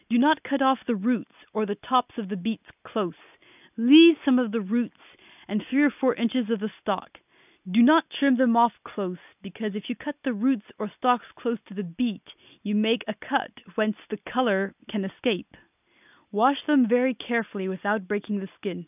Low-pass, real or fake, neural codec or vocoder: 3.6 kHz; real; none